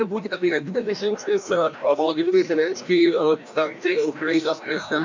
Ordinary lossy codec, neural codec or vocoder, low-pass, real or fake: MP3, 64 kbps; codec, 16 kHz, 1 kbps, FreqCodec, larger model; 7.2 kHz; fake